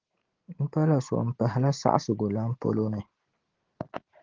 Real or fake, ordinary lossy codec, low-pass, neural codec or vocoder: real; Opus, 16 kbps; 7.2 kHz; none